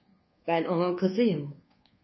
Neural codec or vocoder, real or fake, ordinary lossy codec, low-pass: codec, 24 kHz, 1.2 kbps, DualCodec; fake; MP3, 24 kbps; 7.2 kHz